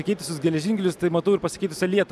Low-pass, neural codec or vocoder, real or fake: 14.4 kHz; none; real